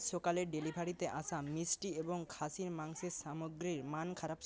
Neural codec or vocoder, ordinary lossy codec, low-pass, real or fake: none; none; none; real